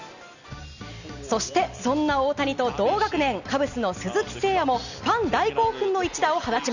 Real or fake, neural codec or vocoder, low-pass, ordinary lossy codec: real; none; 7.2 kHz; none